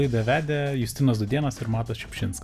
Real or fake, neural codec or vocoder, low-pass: real; none; 14.4 kHz